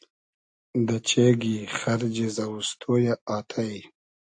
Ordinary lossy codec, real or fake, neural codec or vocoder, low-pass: AAC, 64 kbps; real; none; 9.9 kHz